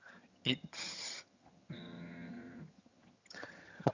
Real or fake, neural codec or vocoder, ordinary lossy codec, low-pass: fake; vocoder, 22.05 kHz, 80 mel bands, HiFi-GAN; Opus, 64 kbps; 7.2 kHz